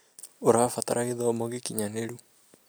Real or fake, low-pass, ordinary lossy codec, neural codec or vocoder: real; none; none; none